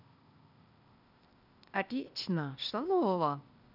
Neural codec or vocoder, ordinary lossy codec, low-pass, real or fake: codec, 16 kHz, 0.8 kbps, ZipCodec; none; 5.4 kHz; fake